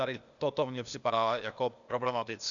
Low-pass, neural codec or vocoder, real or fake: 7.2 kHz; codec, 16 kHz, 0.8 kbps, ZipCodec; fake